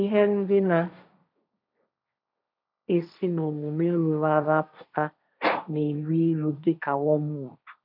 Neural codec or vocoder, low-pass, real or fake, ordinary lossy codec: codec, 16 kHz, 1.1 kbps, Voila-Tokenizer; 5.4 kHz; fake; none